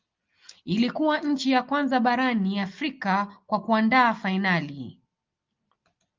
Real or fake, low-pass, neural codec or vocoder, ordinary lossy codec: real; 7.2 kHz; none; Opus, 24 kbps